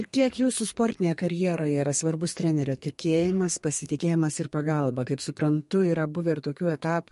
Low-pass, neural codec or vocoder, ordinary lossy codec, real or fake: 14.4 kHz; codec, 32 kHz, 1.9 kbps, SNAC; MP3, 48 kbps; fake